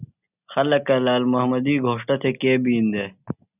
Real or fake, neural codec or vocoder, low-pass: real; none; 3.6 kHz